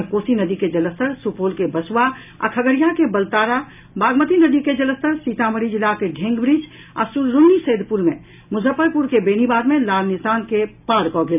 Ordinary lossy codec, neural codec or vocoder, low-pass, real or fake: none; none; 3.6 kHz; real